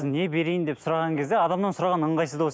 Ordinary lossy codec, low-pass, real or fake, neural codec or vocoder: none; none; real; none